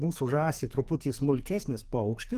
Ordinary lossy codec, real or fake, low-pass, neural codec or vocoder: Opus, 24 kbps; fake; 14.4 kHz; codec, 44.1 kHz, 2.6 kbps, SNAC